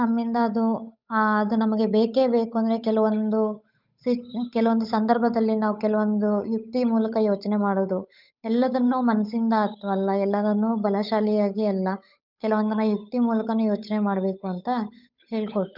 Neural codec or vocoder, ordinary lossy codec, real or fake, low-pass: codec, 16 kHz, 8 kbps, FunCodec, trained on Chinese and English, 25 frames a second; none; fake; 5.4 kHz